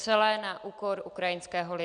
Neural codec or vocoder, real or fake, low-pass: none; real; 9.9 kHz